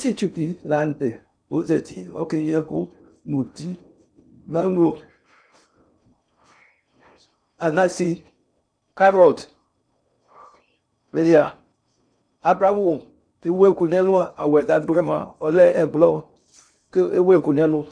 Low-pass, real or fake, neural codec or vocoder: 9.9 kHz; fake; codec, 16 kHz in and 24 kHz out, 0.6 kbps, FocalCodec, streaming, 4096 codes